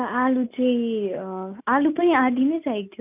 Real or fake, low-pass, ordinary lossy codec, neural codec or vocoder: real; 3.6 kHz; none; none